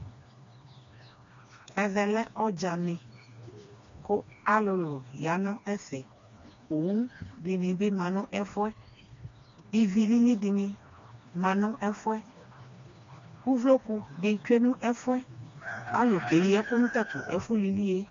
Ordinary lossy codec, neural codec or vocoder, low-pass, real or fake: MP3, 48 kbps; codec, 16 kHz, 2 kbps, FreqCodec, smaller model; 7.2 kHz; fake